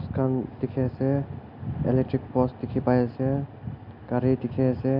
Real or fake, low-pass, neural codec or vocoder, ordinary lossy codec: real; 5.4 kHz; none; none